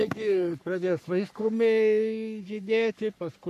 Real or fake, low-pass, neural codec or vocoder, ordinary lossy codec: fake; 14.4 kHz; codec, 44.1 kHz, 3.4 kbps, Pupu-Codec; AAC, 96 kbps